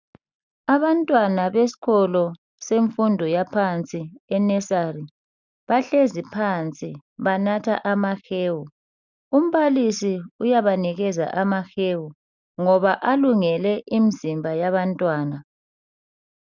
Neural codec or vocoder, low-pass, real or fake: none; 7.2 kHz; real